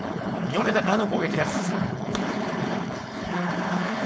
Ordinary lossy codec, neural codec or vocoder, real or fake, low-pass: none; codec, 16 kHz, 4.8 kbps, FACodec; fake; none